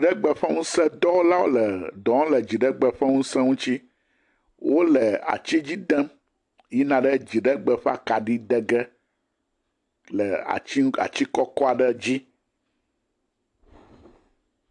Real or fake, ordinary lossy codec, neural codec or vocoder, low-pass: real; AAC, 64 kbps; none; 10.8 kHz